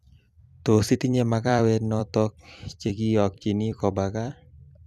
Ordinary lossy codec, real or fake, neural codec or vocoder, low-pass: none; fake; vocoder, 44.1 kHz, 128 mel bands every 256 samples, BigVGAN v2; 14.4 kHz